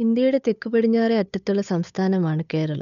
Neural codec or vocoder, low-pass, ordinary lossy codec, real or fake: codec, 16 kHz, 8 kbps, FunCodec, trained on Chinese and English, 25 frames a second; 7.2 kHz; none; fake